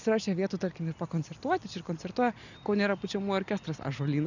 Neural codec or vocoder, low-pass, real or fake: none; 7.2 kHz; real